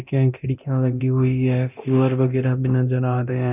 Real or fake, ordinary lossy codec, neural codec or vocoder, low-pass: fake; none; codec, 24 kHz, 0.9 kbps, DualCodec; 3.6 kHz